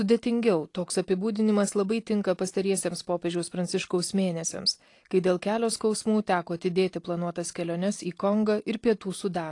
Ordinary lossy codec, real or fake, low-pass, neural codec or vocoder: AAC, 48 kbps; real; 10.8 kHz; none